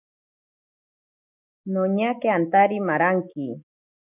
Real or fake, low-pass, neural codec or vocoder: real; 3.6 kHz; none